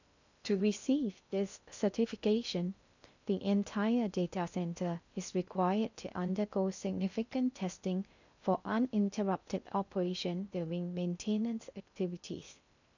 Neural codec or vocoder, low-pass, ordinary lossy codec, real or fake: codec, 16 kHz in and 24 kHz out, 0.6 kbps, FocalCodec, streaming, 2048 codes; 7.2 kHz; none; fake